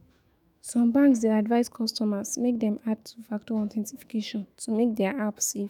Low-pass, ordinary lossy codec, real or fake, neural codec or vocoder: 19.8 kHz; none; fake; autoencoder, 48 kHz, 128 numbers a frame, DAC-VAE, trained on Japanese speech